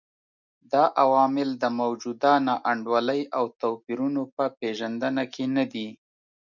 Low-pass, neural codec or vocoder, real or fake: 7.2 kHz; none; real